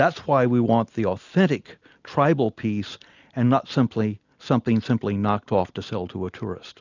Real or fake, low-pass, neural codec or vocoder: real; 7.2 kHz; none